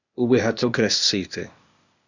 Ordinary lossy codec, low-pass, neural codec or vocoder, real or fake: Opus, 64 kbps; 7.2 kHz; codec, 16 kHz, 0.8 kbps, ZipCodec; fake